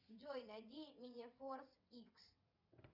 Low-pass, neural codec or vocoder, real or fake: 5.4 kHz; vocoder, 22.05 kHz, 80 mel bands, WaveNeXt; fake